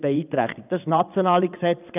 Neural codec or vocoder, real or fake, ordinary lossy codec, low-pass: vocoder, 22.05 kHz, 80 mel bands, WaveNeXt; fake; none; 3.6 kHz